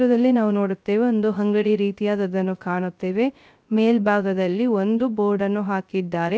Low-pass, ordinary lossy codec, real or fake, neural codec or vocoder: none; none; fake; codec, 16 kHz, 0.3 kbps, FocalCodec